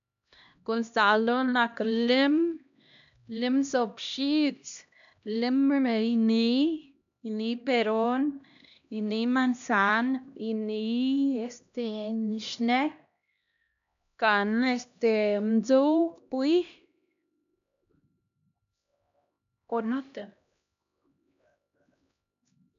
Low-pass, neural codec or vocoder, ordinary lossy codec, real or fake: 7.2 kHz; codec, 16 kHz, 1 kbps, X-Codec, HuBERT features, trained on LibriSpeech; none; fake